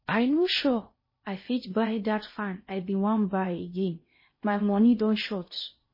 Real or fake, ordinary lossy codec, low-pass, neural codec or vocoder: fake; MP3, 24 kbps; 5.4 kHz; codec, 16 kHz in and 24 kHz out, 0.6 kbps, FocalCodec, streaming, 2048 codes